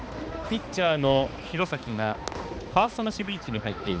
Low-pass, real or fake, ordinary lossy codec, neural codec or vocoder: none; fake; none; codec, 16 kHz, 2 kbps, X-Codec, HuBERT features, trained on balanced general audio